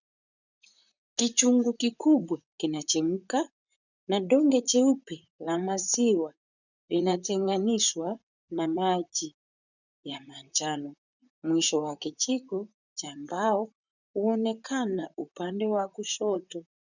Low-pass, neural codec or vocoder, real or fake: 7.2 kHz; vocoder, 44.1 kHz, 128 mel bands, Pupu-Vocoder; fake